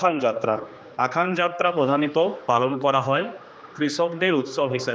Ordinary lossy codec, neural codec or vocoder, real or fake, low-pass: none; codec, 16 kHz, 2 kbps, X-Codec, HuBERT features, trained on general audio; fake; none